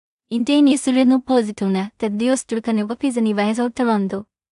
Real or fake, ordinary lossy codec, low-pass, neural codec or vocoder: fake; AAC, 96 kbps; 10.8 kHz; codec, 16 kHz in and 24 kHz out, 0.4 kbps, LongCat-Audio-Codec, two codebook decoder